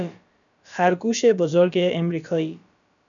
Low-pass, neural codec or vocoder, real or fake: 7.2 kHz; codec, 16 kHz, about 1 kbps, DyCAST, with the encoder's durations; fake